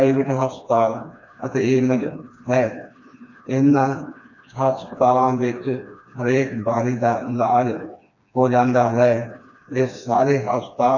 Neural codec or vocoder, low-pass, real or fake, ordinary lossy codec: codec, 16 kHz, 2 kbps, FreqCodec, smaller model; 7.2 kHz; fake; none